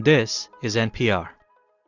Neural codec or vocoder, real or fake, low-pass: none; real; 7.2 kHz